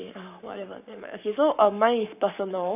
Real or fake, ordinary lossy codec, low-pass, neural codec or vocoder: fake; none; 3.6 kHz; codec, 44.1 kHz, 7.8 kbps, Pupu-Codec